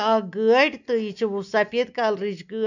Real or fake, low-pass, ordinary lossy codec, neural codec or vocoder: real; 7.2 kHz; none; none